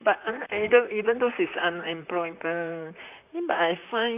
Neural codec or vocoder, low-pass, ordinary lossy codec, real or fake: vocoder, 44.1 kHz, 128 mel bands, Pupu-Vocoder; 3.6 kHz; none; fake